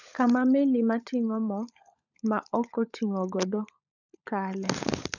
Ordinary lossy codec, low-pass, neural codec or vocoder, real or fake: none; 7.2 kHz; codec, 16 kHz, 16 kbps, FunCodec, trained on LibriTTS, 50 frames a second; fake